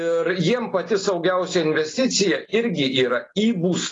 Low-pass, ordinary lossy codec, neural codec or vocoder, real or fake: 10.8 kHz; AAC, 32 kbps; none; real